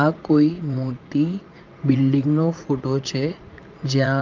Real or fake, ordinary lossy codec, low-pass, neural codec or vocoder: fake; Opus, 24 kbps; 7.2 kHz; vocoder, 44.1 kHz, 80 mel bands, Vocos